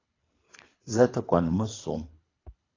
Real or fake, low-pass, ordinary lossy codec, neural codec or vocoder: fake; 7.2 kHz; AAC, 32 kbps; codec, 24 kHz, 3 kbps, HILCodec